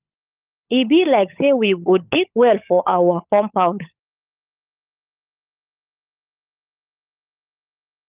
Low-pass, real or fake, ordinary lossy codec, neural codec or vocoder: 3.6 kHz; fake; Opus, 24 kbps; codec, 16 kHz, 16 kbps, FunCodec, trained on LibriTTS, 50 frames a second